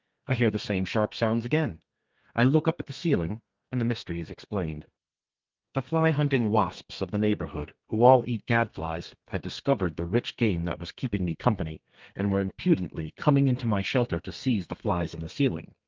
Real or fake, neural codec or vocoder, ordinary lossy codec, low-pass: fake; codec, 32 kHz, 1.9 kbps, SNAC; Opus, 24 kbps; 7.2 kHz